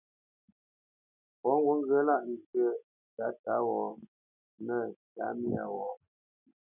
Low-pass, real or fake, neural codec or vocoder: 3.6 kHz; real; none